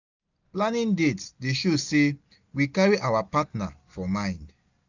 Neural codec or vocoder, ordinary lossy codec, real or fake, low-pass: none; none; real; 7.2 kHz